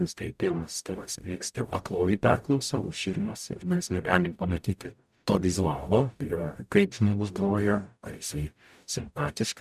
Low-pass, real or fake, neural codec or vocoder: 14.4 kHz; fake; codec, 44.1 kHz, 0.9 kbps, DAC